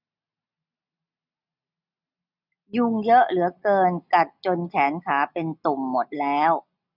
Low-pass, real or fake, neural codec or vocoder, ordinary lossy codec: 5.4 kHz; real; none; none